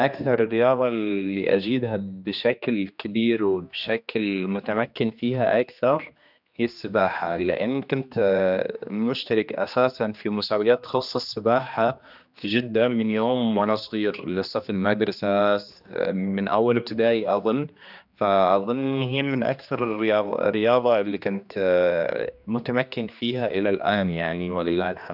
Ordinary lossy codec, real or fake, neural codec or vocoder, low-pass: none; fake; codec, 16 kHz, 2 kbps, X-Codec, HuBERT features, trained on general audio; 5.4 kHz